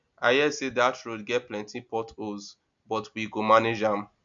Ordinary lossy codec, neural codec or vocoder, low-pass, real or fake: MP3, 64 kbps; none; 7.2 kHz; real